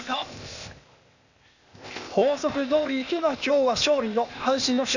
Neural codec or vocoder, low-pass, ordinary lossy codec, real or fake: codec, 16 kHz, 0.8 kbps, ZipCodec; 7.2 kHz; none; fake